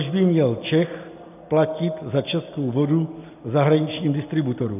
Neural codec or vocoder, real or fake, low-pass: none; real; 3.6 kHz